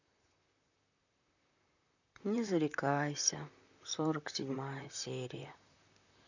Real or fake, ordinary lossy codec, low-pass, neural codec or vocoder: fake; none; 7.2 kHz; vocoder, 44.1 kHz, 128 mel bands, Pupu-Vocoder